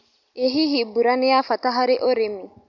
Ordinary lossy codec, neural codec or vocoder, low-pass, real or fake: none; none; 7.2 kHz; real